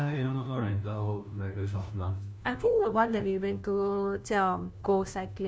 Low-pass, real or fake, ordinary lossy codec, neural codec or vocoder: none; fake; none; codec, 16 kHz, 1 kbps, FunCodec, trained on LibriTTS, 50 frames a second